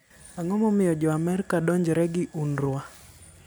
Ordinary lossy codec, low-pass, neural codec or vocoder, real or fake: none; none; none; real